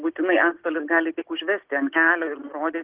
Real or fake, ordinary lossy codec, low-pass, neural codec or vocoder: real; Opus, 16 kbps; 3.6 kHz; none